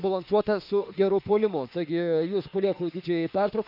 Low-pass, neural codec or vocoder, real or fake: 5.4 kHz; autoencoder, 48 kHz, 32 numbers a frame, DAC-VAE, trained on Japanese speech; fake